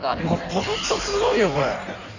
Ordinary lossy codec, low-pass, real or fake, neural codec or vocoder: none; 7.2 kHz; fake; codec, 16 kHz in and 24 kHz out, 1.1 kbps, FireRedTTS-2 codec